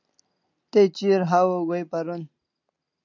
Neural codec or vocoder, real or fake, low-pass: none; real; 7.2 kHz